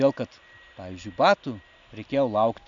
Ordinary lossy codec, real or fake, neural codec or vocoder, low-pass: MP3, 64 kbps; real; none; 7.2 kHz